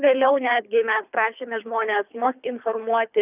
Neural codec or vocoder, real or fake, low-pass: codec, 24 kHz, 3 kbps, HILCodec; fake; 3.6 kHz